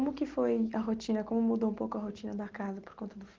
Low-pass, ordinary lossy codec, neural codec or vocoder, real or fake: 7.2 kHz; Opus, 16 kbps; none; real